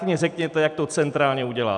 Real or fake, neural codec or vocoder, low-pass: fake; vocoder, 44.1 kHz, 128 mel bands every 256 samples, BigVGAN v2; 10.8 kHz